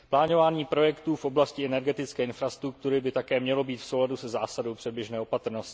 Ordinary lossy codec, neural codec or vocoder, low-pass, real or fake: none; none; none; real